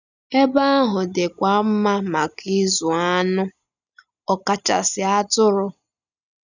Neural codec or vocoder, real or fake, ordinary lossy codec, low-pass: none; real; none; 7.2 kHz